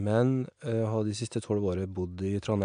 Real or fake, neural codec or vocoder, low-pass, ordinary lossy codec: real; none; 9.9 kHz; AAC, 96 kbps